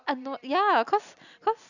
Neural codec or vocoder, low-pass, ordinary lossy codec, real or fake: none; 7.2 kHz; none; real